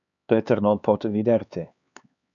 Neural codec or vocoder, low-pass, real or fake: codec, 16 kHz, 4 kbps, X-Codec, HuBERT features, trained on LibriSpeech; 7.2 kHz; fake